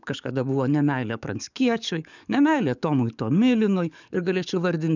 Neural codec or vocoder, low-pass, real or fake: codec, 44.1 kHz, 7.8 kbps, DAC; 7.2 kHz; fake